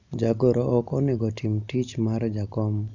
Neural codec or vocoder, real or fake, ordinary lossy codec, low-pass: none; real; none; 7.2 kHz